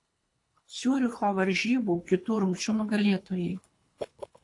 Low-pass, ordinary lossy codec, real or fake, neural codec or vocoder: 10.8 kHz; AAC, 48 kbps; fake; codec, 24 kHz, 3 kbps, HILCodec